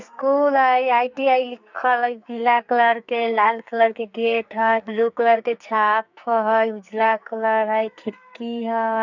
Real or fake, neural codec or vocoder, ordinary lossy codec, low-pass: fake; codec, 44.1 kHz, 2.6 kbps, SNAC; none; 7.2 kHz